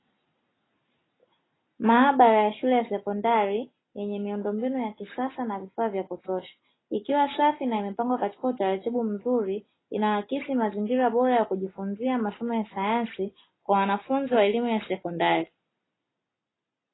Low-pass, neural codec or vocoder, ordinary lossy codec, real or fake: 7.2 kHz; none; AAC, 16 kbps; real